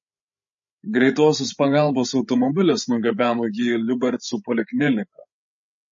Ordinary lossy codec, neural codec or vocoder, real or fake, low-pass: MP3, 32 kbps; codec, 16 kHz, 16 kbps, FreqCodec, larger model; fake; 7.2 kHz